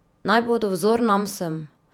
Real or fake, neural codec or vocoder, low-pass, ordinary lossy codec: fake; vocoder, 48 kHz, 128 mel bands, Vocos; 19.8 kHz; none